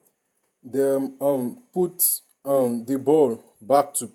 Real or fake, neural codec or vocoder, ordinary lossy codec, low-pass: fake; vocoder, 48 kHz, 128 mel bands, Vocos; none; 19.8 kHz